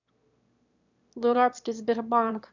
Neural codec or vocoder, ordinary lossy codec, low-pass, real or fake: autoencoder, 22.05 kHz, a latent of 192 numbers a frame, VITS, trained on one speaker; none; 7.2 kHz; fake